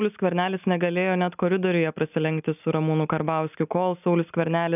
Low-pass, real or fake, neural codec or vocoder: 3.6 kHz; real; none